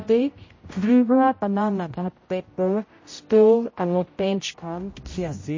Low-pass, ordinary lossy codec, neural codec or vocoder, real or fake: 7.2 kHz; MP3, 32 kbps; codec, 16 kHz, 0.5 kbps, X-Codec, HuBERT features, trained on general audio; fake